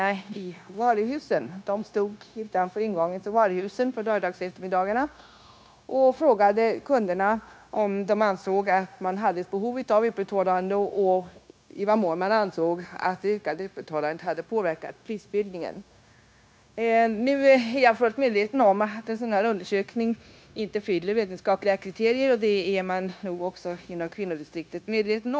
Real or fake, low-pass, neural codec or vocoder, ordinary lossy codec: fake; none; codec, 16 kHz, 0.9 kbps, LongCat-Audio-Codec; none